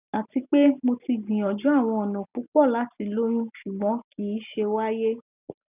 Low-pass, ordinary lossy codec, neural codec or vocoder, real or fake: 3.6 kHz; none; none; real